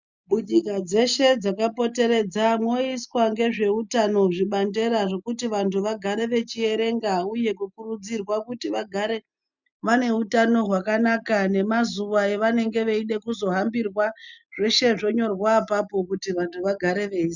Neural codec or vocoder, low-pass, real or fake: none; 7.2 kHz; real